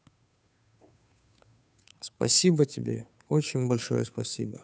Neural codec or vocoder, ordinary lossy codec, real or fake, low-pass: codec, 16 kHz, 2 kbps, FunCodec, trained on Chinese and English, 25 frames a second; none; fake; none